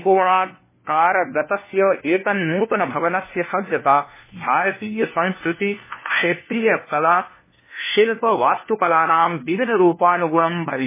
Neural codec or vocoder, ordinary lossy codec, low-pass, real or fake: codec, 16 kHz, 1 kbps, FunCodec, trained on LibriTTS, 50 frames a second; MP3, 16 kbps; 3.6 kHz; fake